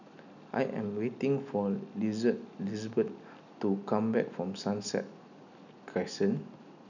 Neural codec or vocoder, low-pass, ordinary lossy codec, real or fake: none; 7.2 kHz; none; real